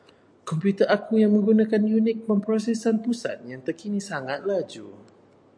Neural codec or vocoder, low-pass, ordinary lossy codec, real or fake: none; 9.9 kHz; MP3, 96 kbps; real